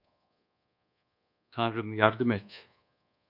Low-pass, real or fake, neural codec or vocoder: 5.4 kHz; fake; codec, 24 kHz, 1.2 kbps, DualCodec